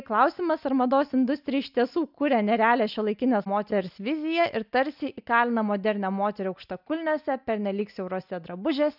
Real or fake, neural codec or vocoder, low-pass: real; none; 5.4 kHz